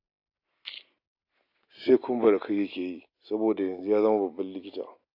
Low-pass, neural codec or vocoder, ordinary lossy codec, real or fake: 5.4 kHz; none; AAC, 32 kbps; real